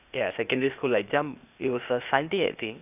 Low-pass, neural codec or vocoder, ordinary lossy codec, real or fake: 3.6 kHz; codec, 16 kHz, 0.8 kbps, ZipCodec; none; fake